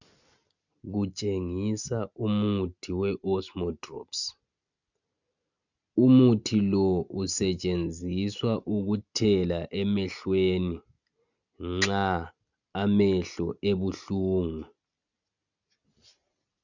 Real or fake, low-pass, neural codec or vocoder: real; 7.2 kHz; none